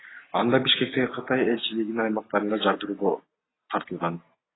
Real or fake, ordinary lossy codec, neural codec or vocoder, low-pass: real; AAC, 16 kbps; none; 7.2 kHz